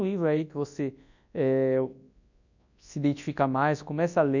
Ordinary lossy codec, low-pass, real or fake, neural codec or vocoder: none; 7.2 kHz; fake; codec, 24 kHz, 0.9 kbps, WavTokenizer, large speech release